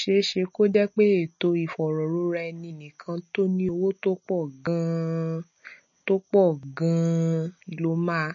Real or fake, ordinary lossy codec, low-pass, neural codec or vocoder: real; MP3, 32 kbps; 7.2 kHz; none